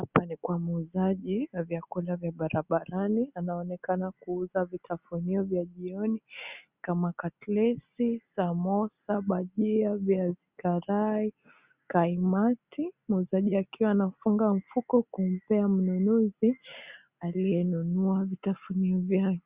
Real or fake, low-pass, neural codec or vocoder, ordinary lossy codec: real; 3.6 kHz; none; Opus, 64 kbps